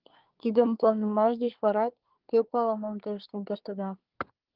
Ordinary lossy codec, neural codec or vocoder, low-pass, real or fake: Opus, 32 kbps; codec, 24 kHz, 1 kbps, SNAC; 5.4 kHz; fake